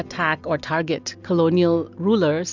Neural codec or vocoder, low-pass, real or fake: none; 7.2 kHz; real